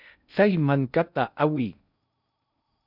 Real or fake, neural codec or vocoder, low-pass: fake; codec, 16 kHz in and 24 kHz out, 0.6 kbps, FocalCodec, streaming, 2048 codes; 5.4 kHz